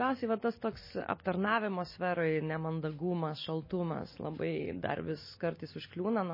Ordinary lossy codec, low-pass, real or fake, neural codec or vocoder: MP3, 24 kbps; 5.4 kHz; real; none